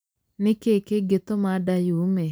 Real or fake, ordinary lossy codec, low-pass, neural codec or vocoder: real; none; none; none